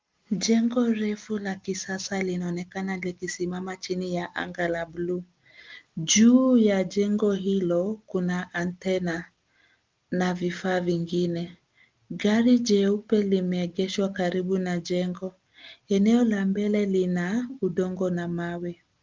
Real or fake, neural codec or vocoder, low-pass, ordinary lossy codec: real; none; 7.2 kHz; Opus, 24 kbps